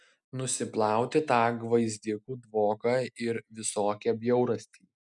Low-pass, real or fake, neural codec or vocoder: 10.8 kHz; real; none